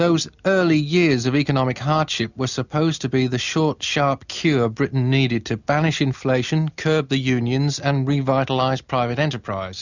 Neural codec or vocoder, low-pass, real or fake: none; 7.2 kHz; real